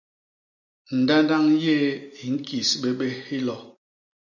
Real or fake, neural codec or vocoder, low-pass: real; none; 7.2 kHz